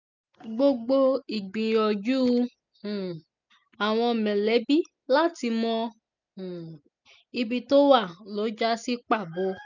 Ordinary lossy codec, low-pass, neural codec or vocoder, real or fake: none; 7.2 kHz; none; real